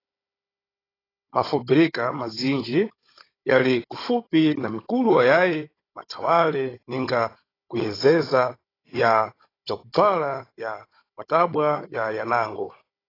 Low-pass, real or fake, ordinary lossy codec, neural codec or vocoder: 5.4 kHz; fake; AAC, 24 kbps; codec, 16 kHz, 16 kbps, FunCodec, trained on Chinese and English, 50 frames a second